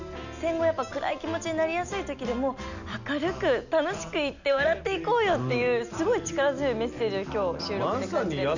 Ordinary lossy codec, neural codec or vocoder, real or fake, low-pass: none; none; real; 7.2 kHz